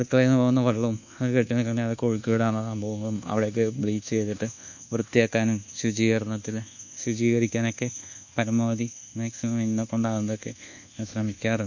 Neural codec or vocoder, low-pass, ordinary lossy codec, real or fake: codec, 24 kHz, 1.2 kbps, DualCodec; 7.2 kHz; none; fake